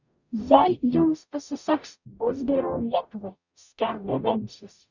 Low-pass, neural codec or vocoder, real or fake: 7.2 kHz; codec, 44.1 kHz, 0.9 kbps, DAC; fake